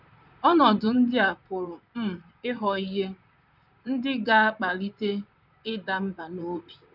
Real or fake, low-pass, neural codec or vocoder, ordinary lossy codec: fake; 5.4 kHz; vocoder, 44.1 kHz, 128 mel bands, Pupu-Vocoder; none